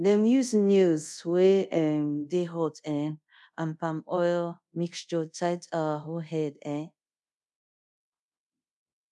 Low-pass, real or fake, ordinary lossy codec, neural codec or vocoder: none; fake; none; codec, 24 kHz, 0.5 kbps, DualCodec